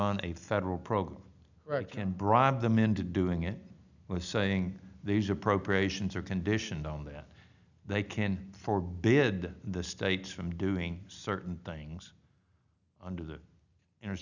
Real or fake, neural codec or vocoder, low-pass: real; none; 7.2 kHz